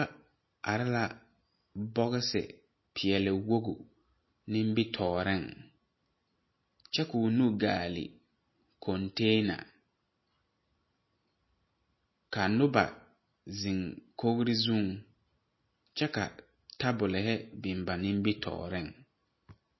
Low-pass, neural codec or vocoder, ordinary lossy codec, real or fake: 7.2 kHz; none; MP3, 24 kbps; real